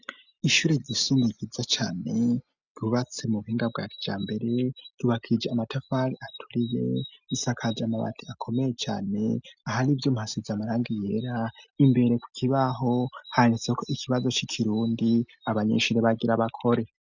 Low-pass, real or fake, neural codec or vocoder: 7.2 kHz; real; none